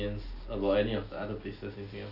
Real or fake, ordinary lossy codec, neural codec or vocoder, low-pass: real; none; none; 5.4 kHz